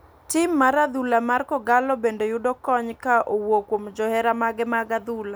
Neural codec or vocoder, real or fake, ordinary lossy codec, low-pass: none; real; none; none